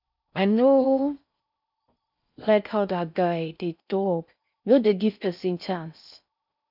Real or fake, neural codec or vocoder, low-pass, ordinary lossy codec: fake; codec, 16 kHz in and 24 kHz out, 0.6 kbps, FocalCodec, streaming, 4096 codes; 5.4 kHz; none